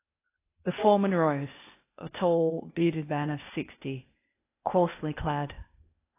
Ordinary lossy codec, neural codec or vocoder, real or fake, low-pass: AAC, 24 kbps; codec, 16 kHz, 0.8 kbps, ZipCodec; fake; 3.6 kHz